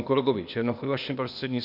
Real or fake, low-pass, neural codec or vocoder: fake; 5.4 kHz; codec, 16 kHz, 0.8 kbps, ZipCodec